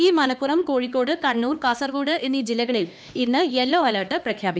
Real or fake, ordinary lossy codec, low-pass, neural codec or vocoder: fake; none; none; codec, 16 kHz, 2 kbps, X-Codec, HuBERT features, trained on LibriSpeech